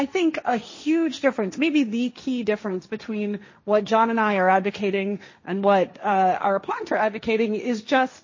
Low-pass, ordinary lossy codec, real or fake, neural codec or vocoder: 7.2 kHz; MP3, 32 kbps; fake; codec, 16 kHz, 1.1 kbps, Voila-Tokenizer